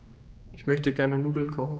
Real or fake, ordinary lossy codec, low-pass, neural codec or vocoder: fake; none; none; codec, 16 kHz, 2 kbps, X-Codec, HuBERT features, trained on general audio